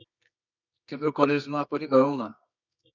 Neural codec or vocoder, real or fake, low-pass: codec, 24 kHz, 0.9 kbps, WavTokenizer, medium music audio release; fake; 7.2 kHz